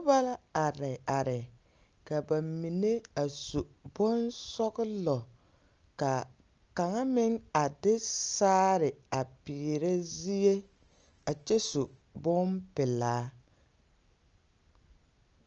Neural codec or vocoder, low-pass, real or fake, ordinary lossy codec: none; 7.2 kHz; real; Opus, 24 kbps